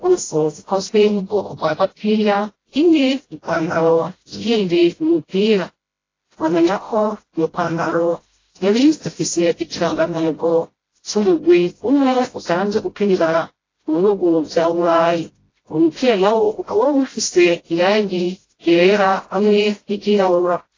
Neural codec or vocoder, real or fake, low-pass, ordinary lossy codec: codec, 16 kHz, 0.5 kbps, FreqCodec, smaller model; fake; 7.2 kHz; AAC, 32 kbps